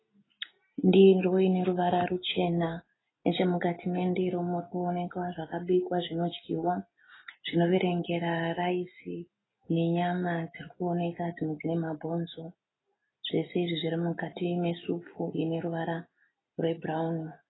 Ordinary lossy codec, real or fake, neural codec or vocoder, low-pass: AAC, 16 kbps; real; none; 7.2 kHz